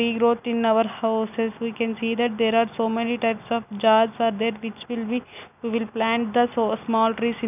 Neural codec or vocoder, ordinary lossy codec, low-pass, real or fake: none; none; 3.6 kHz; real